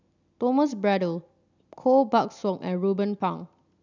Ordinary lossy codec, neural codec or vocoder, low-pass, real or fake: none; none; 7.2 kHz; real